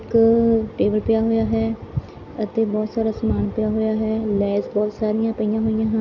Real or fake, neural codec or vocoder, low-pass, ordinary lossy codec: real; none; 7.2 kHz; none